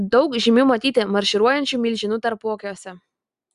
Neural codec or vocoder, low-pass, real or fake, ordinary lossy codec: none; 10.8 kHz; real; Opus, 64 kbps